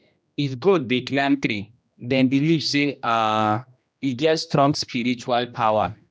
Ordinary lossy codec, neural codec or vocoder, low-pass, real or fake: none; codec, 16 kHz, 1 kbps, X-Codec, HuBERT features, trained on general audio; none; fake